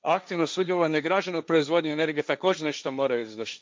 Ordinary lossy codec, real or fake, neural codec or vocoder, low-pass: none; fake; codec, 16 kHz, 1.1 kbps, Voila-Tokenizer; none